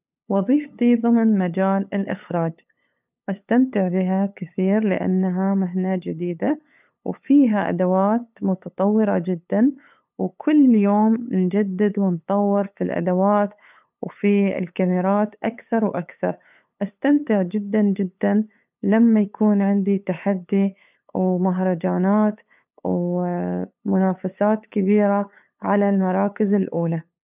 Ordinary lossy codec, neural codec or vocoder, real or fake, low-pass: none; codec, 16 kHz, 8 kbps, FunCodec, trained on LibriTTS, 25 frames a second; fake; 3.6 kHz